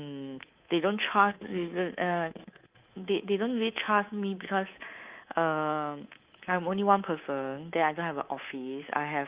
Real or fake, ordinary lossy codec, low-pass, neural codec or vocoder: fake; Opus, 24 kbps; 3.6 kHz; codec, 24 kHz, 3.1 kbps, DualCodec